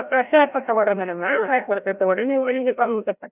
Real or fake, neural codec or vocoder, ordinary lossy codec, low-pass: fake; codec, 16 kHz, 0.5 kbps, FreqCodec, larger model; none; 3.6 kHz